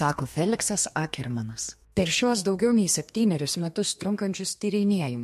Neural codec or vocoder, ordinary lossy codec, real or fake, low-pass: codec, 32 kHz, 1.9 kbps, SNAC; MP3, 64 kbps; fake; 14.4 kHz